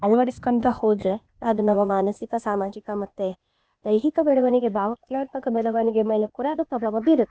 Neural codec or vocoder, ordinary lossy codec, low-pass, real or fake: codec, 16 kHz, 0.8 kbps, ZipCodec; none; none; fake